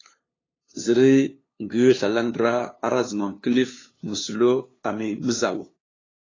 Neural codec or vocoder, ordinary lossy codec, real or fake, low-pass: codec, 16 kHz, 2 kbps, FunCodec, trained on LibriTTS, 25 frames a second; AAC, 32 kbps; fake; 7.2 kHz